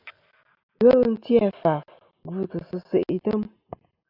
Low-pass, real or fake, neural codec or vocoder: 5.4 kHz; real; none